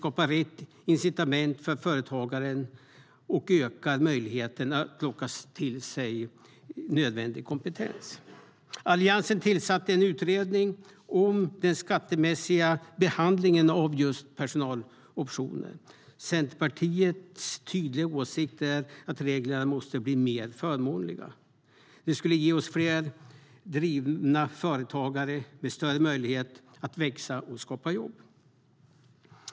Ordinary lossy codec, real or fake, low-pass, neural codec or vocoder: none; real; none; none